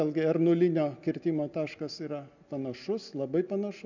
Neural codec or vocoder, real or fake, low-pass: none; real; 7.2 kHz